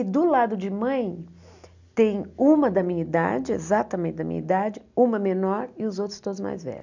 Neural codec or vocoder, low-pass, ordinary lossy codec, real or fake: none; 7.2 kHz; none; real